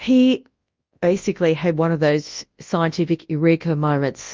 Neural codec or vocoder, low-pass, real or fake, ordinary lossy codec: codec, 24 kHz, 0.9 kbps, WavTokenizer, large speech release; 7.2 kHz; fake; Opus, 32 kbps